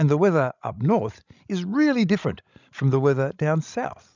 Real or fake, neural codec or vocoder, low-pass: fake; codec, 16 kHz, 16 kbps, FreqCodec, larger model; 7.2 kHz